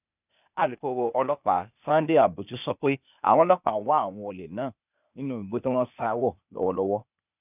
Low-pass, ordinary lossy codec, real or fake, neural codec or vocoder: 3.6 kHz; none; fake; codec, 16 kHz, 0.8 kbps, ZipCodec